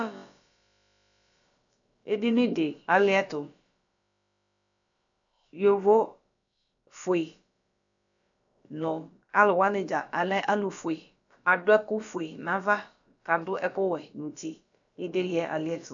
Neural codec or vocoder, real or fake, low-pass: codec, 16 kHz, about 1 kbps, DyCAST, with the encoder's durations; fake; 7.2 kHz